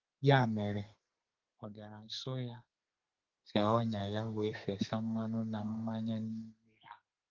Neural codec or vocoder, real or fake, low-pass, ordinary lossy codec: codec, 32 kHz, 1.9 kbps, SNAC; fake; 7.2 kHz; Opus, 32 kbps